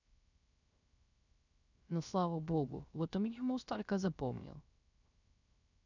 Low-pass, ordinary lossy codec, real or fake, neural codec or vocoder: 7.2 kHz; none; fake; codec, 16 kHz, 0.3 kbps, FocalCodec